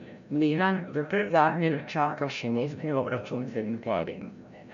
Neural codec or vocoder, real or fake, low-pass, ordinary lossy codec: codec, 16 kHz, 0.5 kbps, FreqCodec, larger model; fake; 7.2 kHz; none